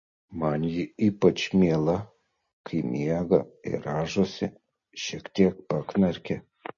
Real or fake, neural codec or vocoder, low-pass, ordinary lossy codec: real; none; 7.2 kHz; MP3, 32 kbps